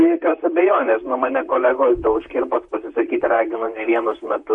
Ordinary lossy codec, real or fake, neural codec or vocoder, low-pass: MP3, 48 kbps; fake; vocoder, 44.1 kHz, 128 mel bands, Pupu-Vocoder; 10.8 kHz